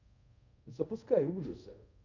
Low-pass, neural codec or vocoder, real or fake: 7.2 kHz; codec, 24 kHz, 0.5 kbps, DualCodec; fake